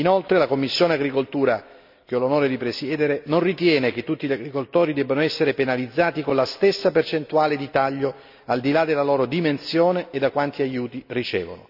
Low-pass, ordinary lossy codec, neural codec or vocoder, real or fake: 5.4 kHz; none; none; real